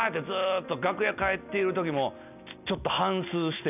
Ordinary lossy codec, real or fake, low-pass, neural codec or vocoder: none; real; 3.6 kHz; none